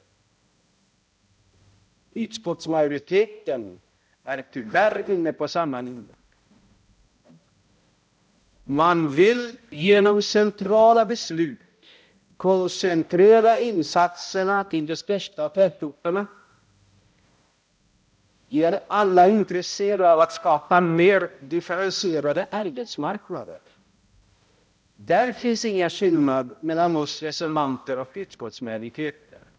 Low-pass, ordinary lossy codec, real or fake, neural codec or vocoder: none; none; fake; codec, 16 kHz, 0.5 kbps, X-Codec, HuBERT features, trained on balanced general audio